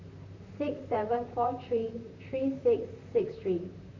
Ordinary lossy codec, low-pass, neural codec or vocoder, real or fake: AAC, 48 kbps; 7.2 kHz; vocoder, 44.1 kHz, 128 mel bands, Pupu-Vocoder; fake